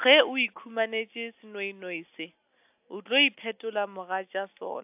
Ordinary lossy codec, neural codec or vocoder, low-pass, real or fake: AAC, 32 kbps; none; 3.6 kHz; real